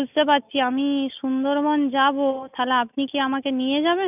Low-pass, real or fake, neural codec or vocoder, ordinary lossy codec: 3.6 kHz; real; none; none